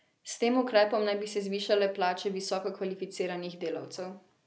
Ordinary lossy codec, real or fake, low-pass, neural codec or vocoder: none; real; none; none